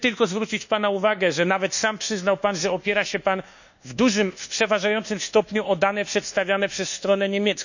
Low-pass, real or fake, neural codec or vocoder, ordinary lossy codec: 7.2 kHz; fake; codec, 24 kHz, 1.2 kbps, DualCodec; none